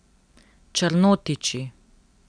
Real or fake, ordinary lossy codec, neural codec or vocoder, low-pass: real; none; none; 9.9 kHz